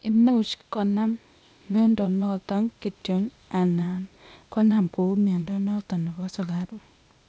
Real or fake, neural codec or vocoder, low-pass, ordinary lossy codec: fake; codec, 16 kHz, 0.8 kbps, ZipCodec; none; none